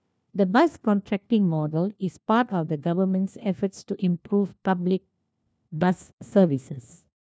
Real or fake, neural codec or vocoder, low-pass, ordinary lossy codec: fake; codec, 16 kHz, 1 kbps, FunCodec, trained on LibriTTS, 50 frames a second; none; none